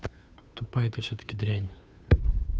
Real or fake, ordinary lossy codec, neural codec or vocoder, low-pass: fake; none; codec, 16 kHz, 2 kbps, FunCodec, trained on Chinese and English, 25 frames a second; none